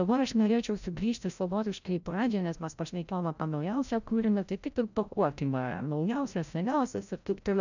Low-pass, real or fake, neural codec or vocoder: 7.2 kHz; fake; codec, 16 kHz, 0.5 kbps, FreqCodec, larger model